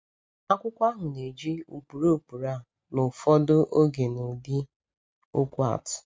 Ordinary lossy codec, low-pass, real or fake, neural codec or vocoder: none; none; real; none